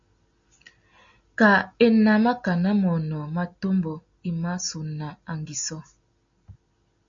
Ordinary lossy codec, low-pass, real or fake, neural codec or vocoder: AAC, 64 kbps; 7.2 kHz; real; none